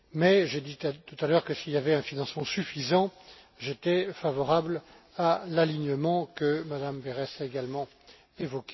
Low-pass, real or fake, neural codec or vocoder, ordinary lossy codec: 7.2 kHz; real; none; MP3, 24 kbps